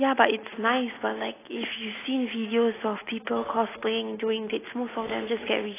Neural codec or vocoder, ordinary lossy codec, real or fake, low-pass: none; AAC, 16 kbps; real; 3.6 kHz